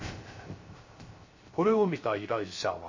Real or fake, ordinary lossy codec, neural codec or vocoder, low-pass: fake; MP3, 32 kbps; codec, 16 kHz, 0.3 kbps, FocalCodec; 7.2 kHz